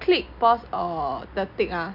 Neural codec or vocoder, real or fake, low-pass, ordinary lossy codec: none; real; 5.4 kHz; none